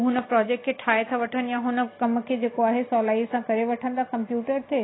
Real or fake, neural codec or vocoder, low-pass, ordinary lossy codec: real; none; 7.2 kHz; AAC, 16 kbps